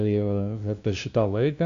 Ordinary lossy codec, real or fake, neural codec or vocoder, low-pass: AAC, 64 kbps; fake; codec, 16 kHz, 0.5 kbps, FunCodec, trained on LibriTTS, 25 frames a second; 7.2 kHz